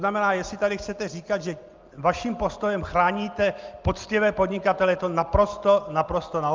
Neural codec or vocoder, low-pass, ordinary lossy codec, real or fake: none; 7.2 kHz; Opus, 32 kbps; real